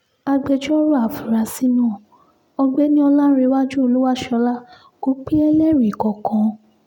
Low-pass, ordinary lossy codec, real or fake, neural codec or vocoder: 19.8 kHz; none; real; none